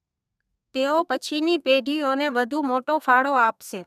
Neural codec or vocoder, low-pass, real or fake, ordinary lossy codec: codec, 32 kHz, 1.9 kbps, SNAC; 14.4 kHz; fake; none